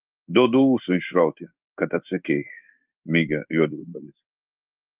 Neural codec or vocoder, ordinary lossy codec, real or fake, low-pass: codec, 16 kHz in and 24 kHz out, 1 kbps, XY-Tokenizer; Opus, 32 kbps; fake; 3.6 kHz